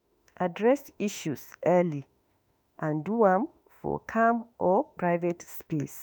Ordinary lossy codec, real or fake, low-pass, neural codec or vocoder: none; fake; none; autoencoder, 48 kHz, 32 numbers a frame, DAC-VAE, trained on Japanese speech